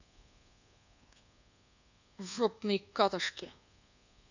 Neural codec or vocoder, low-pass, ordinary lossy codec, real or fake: codec, 24 kHz, 1.2 kbps, DualCodec; 7.2 kHz; none; fake